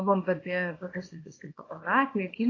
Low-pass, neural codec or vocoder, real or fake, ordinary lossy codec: 7.2 kHz; codec, 24 kHz, 0.9 kbps, WavTokenizer, small release; fake; AAC, 32 kbps